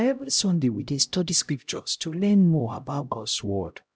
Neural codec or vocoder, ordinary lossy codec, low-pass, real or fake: codec, 16 kHz, 0.5 kbps, X-Codec, HuBERT features, trained on LibriSpeech; none; none; fake